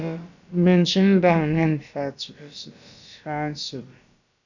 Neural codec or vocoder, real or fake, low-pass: codec, 16 kHz, about 1 kbps, DyCAST, with the encoder's durations; fake; 7.2 kHz